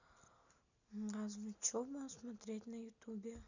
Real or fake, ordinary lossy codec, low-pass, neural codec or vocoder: real; none; 7.2 kHz; none